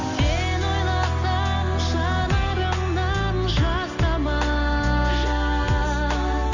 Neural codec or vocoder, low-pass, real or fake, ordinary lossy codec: none; 7.2 kHz; real; none